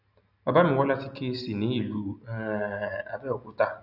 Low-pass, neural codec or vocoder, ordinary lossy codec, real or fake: 5.4 kHz; none; none; real